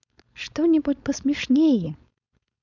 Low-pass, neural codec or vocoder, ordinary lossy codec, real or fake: 7.2 kHz; codec, 16 kHz, 4.8 kbps, FACodec; none; fake